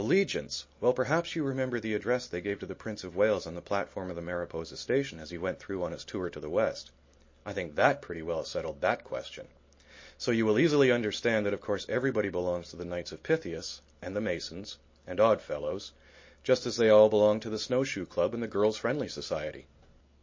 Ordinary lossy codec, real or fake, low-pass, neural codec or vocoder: MP3, 32 kbps; real; 7.2 kHz; none